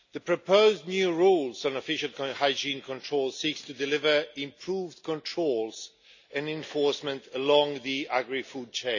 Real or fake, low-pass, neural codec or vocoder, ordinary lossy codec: real; 7.2 kHz; none; none